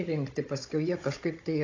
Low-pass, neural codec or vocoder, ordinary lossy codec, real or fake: 7.2 kHz; codec, 16 kHz, 16 kbps, FunCodec, trained on Chinese and English, 50 frames a second; AAC, 48 kbps; fake